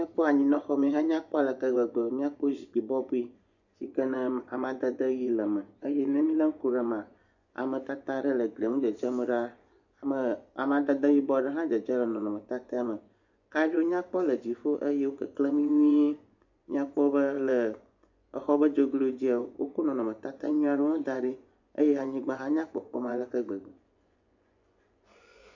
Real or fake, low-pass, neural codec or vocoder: fake; 7.2 kHz; vocoder, 24 kHz, 100 mel bands, Vocos